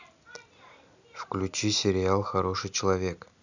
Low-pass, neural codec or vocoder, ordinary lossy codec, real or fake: 7.2 kHz; none; none; real